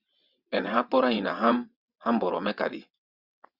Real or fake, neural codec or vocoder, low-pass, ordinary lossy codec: fake; vocoder, 22.05 kHz, 80 mel bands, WaveNeXt; 5.4 kHz; Opus, 64 kbps